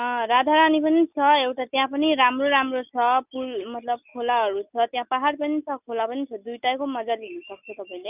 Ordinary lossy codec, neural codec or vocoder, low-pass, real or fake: none; none; 3.6 kHz; real